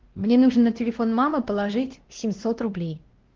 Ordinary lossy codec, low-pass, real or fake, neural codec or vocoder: Opus, 16 kbps; 7.2 kHz; fake; codec, 16 kHz, 1 kbps, X-Codec, WavLM features, trained on Multilingual LibriSpeech